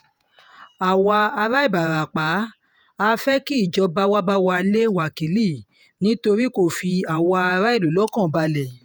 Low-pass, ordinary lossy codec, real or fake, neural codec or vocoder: none; none; fake; vocoder, 48 kHz, 128 mel bands, Vocos